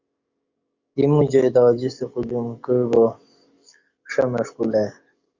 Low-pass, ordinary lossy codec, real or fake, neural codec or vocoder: 7.2 kHz; Opus, 64 kbps; fake; codec, 44.1 kHz, 7.8 kbps, DAC